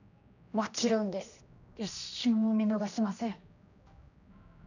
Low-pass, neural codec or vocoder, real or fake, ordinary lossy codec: 7.2 kHz; codec, 16 kHz, 1 kbps, X-Codec, HuBERT features, trained on general audio; fake; none